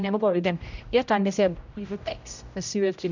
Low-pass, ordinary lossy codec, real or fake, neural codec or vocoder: 7.2 kHz; none; fake; codec, 16 kHz, 0.5 kbps, X-Codec, HuBERT features, trained on general audio